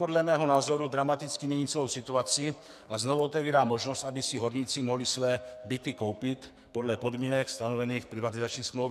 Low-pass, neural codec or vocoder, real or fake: 14.4 kHz; codec, 44.1 kHz, 2.6 kbps, SNAC; fake